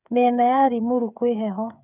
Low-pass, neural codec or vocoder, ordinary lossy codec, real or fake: 3.6 kHz; codec, 16 kHz, 8 kbps, FreqCodec, smaller model; none; fake